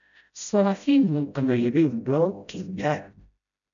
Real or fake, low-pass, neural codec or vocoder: fake; 7.2 kHz; codec, 16 kHz, 0.5 kbps, FreqCodec, smaller model